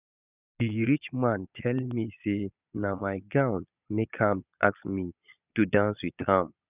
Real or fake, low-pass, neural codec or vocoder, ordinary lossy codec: fake; 3.6 kHz; vocoder, 22.05 kHz, 80 mel bands, Vocos; none